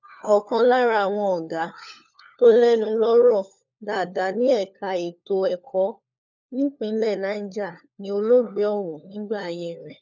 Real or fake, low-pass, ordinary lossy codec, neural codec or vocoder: fake; 7.2 kHz; none; codec, 16 kHz, 4 kbps, FunCodec, trained on LibriTTS, 50 frames a second